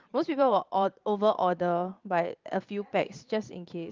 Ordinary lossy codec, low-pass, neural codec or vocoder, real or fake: Opus, 24 kbps; 7.2 kHz; vocoder, 22.05 kHz, 80 mel bands, Vocos; fake